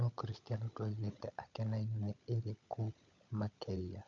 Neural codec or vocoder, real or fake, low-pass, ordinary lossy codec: codec, 16 kHz, 16 kbps, FunCodec, trained on LibriTTS, 50 frames a second; fake; 7.2 kHz; none